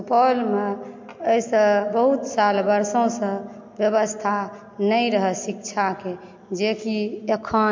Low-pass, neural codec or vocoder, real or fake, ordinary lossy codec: 7.2 kHz; none; real; MP3, 48 kbps